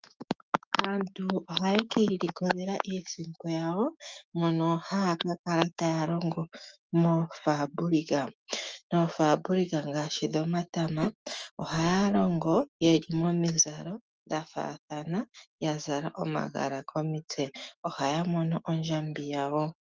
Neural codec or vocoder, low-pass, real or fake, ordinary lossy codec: autoencoder, 48 kHz, 128 numbers a frame, DAC-VAE, trained on Japanese speech; 7.2 kHz; fake; Opus, 24 kbps